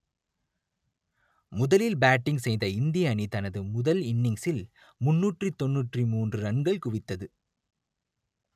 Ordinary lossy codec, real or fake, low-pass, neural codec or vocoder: none; real; 14.4 kHz; none